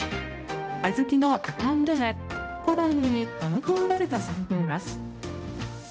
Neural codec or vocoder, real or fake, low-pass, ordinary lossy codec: codec, 16 kHz, 0.5 kbps, X-Codec, HuBERT features, trained on balanced general audio; fake; none; none